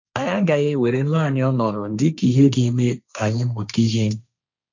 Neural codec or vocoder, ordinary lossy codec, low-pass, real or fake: codec, 16 kHz, 1.1 kbps, Voila-Tokenizer; none; 7.2 kHz; fake